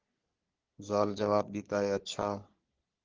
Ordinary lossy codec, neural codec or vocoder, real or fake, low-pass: Opus, 16 kbps; codec, 44.1 kHz, 3.4 kbps, Pupu-Codec; fake; 7.2 kHz